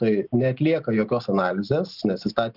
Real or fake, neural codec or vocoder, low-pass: real; none; 5.4 kHz